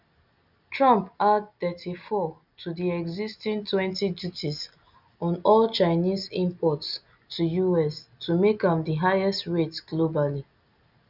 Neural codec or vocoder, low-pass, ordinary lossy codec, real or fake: none; 5.4 kHz; none; real